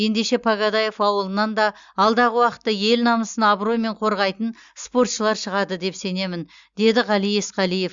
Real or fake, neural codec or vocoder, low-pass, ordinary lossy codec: real; none; 7.2 kHz; Opus, 64 kbps